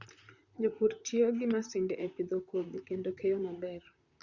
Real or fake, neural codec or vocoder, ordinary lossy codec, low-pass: fake; vocoder, 44.1 kHz, 128 mel bands, Pupu-Vocoder; Opus, 64 kbps; 7.2 kHz